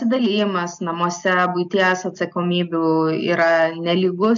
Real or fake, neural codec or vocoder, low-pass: real; none; 7.2 kHz